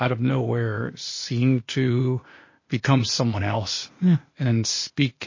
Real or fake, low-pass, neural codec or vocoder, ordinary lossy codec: fake; 7.2 kHz; codec, 16 kHz, 0.8 kbps, ZipCodec; MP3, 32 kbps